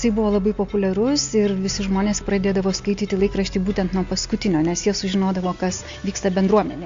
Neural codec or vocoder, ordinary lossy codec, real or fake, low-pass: none; AAC, 64 kbps; real; 7.2 kHz